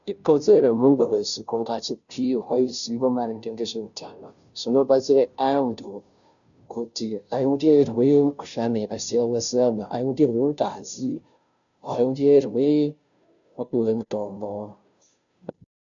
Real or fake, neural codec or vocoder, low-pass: fake; codec, 16 kHz, 0.5 kbps, FunCodec, trained on Chinese and English, 25 frames a second; 7.2 kHz